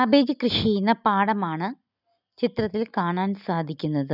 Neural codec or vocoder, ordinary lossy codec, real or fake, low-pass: none; none; real; 5.4 kHz